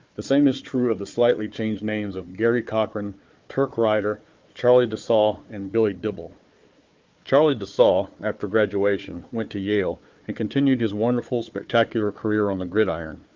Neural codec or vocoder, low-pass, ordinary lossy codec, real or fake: codec, 16 kHz, 4 kbps, FunCodec, trained on Chinese and English, 50 frames a second; 7.2 kHz; Opus, 24 kbps; fake